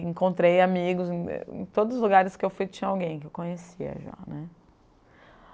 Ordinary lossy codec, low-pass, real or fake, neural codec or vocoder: none; none; real; none